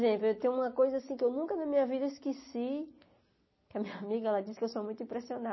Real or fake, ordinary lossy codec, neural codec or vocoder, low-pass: real; MP3, 24 kbps; none; 7.2 kHz